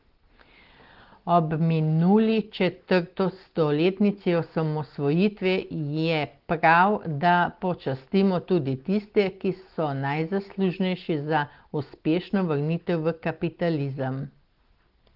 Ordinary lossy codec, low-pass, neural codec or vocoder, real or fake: Opus, 16 kbps; 5.4 kHz; none; real